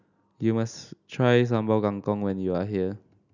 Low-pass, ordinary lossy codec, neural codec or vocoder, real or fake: 7.2 kHz; none; none; real